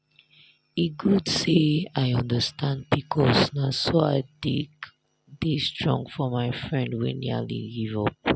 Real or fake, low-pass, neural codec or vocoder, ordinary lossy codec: real; none; none; none